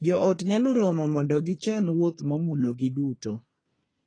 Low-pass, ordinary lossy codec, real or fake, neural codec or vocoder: 9.9 kHz; AAC, 32 kbps; fake; codec, 24 kHz, 1 kbps, SNAC